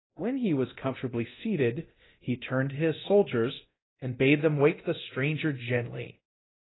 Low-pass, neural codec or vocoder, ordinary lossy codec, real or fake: 7.2 kHz; codec, 24 kHz, 0.9 kbps, DualCodec; AAC, 16 kbps; fake